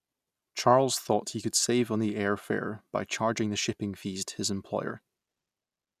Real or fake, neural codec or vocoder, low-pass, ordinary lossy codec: real; none; 14.4 kHz; none